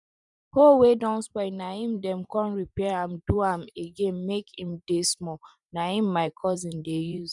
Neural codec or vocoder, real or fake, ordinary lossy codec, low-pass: vocoder, 44.1 kHz, 128 mel bands every 512 samples, BigVGAN v2; fake; none; 10.8 kHz